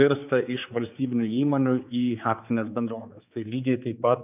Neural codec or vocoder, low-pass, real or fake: codec, 16 kHz, 4 kbps, X-Codec, HuBERT features, trained on general audio; 3.6 kHz; fake